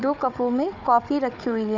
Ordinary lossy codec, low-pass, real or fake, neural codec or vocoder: none; 7.2 kHz; fake; codec, 16 kHz, 4 kbps, FunCodec, trained on Chinese and English, 50 frames a second